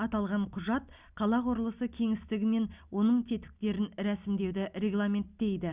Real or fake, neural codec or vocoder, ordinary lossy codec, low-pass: real; none; Opus, 64 kbps; 3.6 kHz